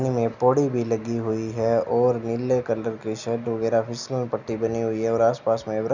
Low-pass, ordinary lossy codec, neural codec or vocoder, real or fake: 7.2 kHz; none; none; real